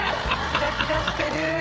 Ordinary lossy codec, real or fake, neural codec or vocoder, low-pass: none; fake; codec, 16 kHz, 8 kbps, FreqCodec, larger model; none